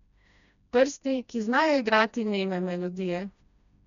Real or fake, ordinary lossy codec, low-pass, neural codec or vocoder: fake; none; 7.2 kHz; codec, 16 kHz, 1 kbps, FreqCodec, smaller model